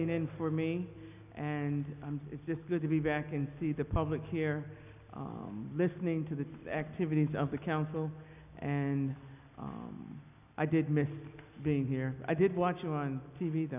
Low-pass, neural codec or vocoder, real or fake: 3.6 kHz; none; real